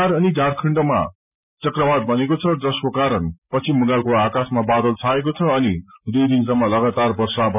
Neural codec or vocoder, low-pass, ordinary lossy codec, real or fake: none; 3.6 kHz; none; real